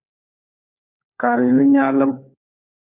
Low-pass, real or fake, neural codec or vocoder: 3.6 kHz; fake; codec, 16 kHz, 4 kbps, FunCodec, trained on LibriTTS, 50 frames a second